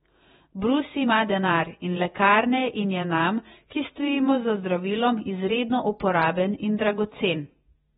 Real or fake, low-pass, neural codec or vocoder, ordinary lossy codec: fake; 19.8 kHz; vocoder, 48 kHz, 128 mel bands, Vocos; AAC, 16 kbps